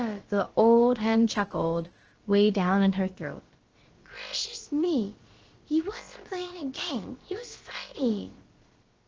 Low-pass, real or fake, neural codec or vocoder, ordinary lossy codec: 7.2 kHz; fake; codec, 16 kHz, about 1 kbps, DyCAST, with the encoder's durations; Opus, 16 kbps